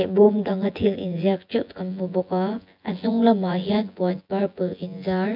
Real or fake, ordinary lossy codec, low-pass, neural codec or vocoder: fake; AAC, 48 kbps; 5.4 kHz; vocoder, 24 kHz, 100 mel bands, Vocos